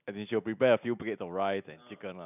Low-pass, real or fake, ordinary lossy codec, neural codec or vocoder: 3.6 kHz; real; none; none